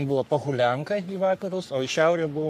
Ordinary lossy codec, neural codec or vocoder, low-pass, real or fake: MP3, 96 kbps; codec, 44.1 kHz, 3.4 kbps, Pupu-Codec; 14.4 kHz; fake